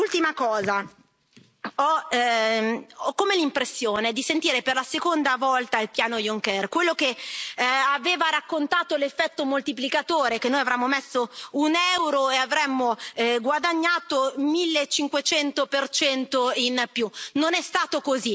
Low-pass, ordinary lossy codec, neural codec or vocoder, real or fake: none; none; none; real